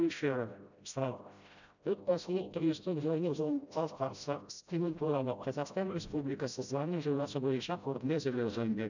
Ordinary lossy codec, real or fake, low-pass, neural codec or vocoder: none; fake; 7.2 kHz; codec, 16 kHz, 0.5 kbps, FreqCodec, smaller model